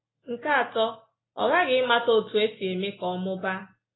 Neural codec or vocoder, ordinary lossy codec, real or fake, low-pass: none; AAC, 16 kbps; real; 7.2 kHz